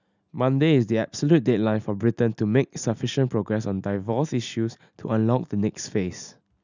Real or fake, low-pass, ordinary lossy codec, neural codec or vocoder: real; 7.2 kHz; none; none